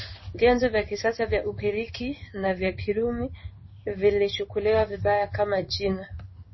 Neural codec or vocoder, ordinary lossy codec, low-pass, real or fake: codec, 16 kHz in and 24 kHz out, 1 kbps, XY-Tokenizer; MP3, 24 kbps; 7.2 kHz; fake